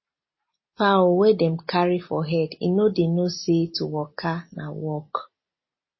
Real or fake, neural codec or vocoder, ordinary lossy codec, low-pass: real; none; MP3, 24 kbps; 7.2 kHz